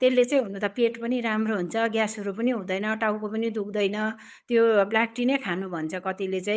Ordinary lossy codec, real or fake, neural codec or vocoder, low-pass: none; fake; codec, 16 kHz, 8 kbps, FunCodec, trained on Chinese and English, 25 frames a second; none